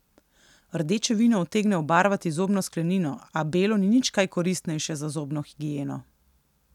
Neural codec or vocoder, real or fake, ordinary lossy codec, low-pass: none; real; none; 19.8 kHz